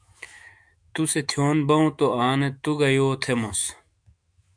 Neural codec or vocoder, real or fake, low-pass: autoencoder, 48 kHz, 128 numbers a frame, DAC-VAE, trained on Japanese speech; fake; 9.9 kHz